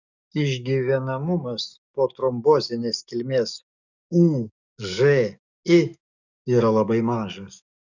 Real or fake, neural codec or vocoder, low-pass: fake; codec, 44.1 kHz, 7.8 kbps, DAC; 7.2 kHz